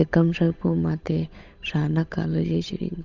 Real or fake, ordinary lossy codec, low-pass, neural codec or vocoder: fake; none; 7.2 kHz; codec, 16 kHz, 8 kbps, FunCodec, trained on Chinese and English, 25 frames a second